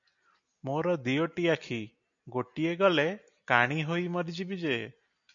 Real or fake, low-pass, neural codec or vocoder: real; 7.2 kHz; none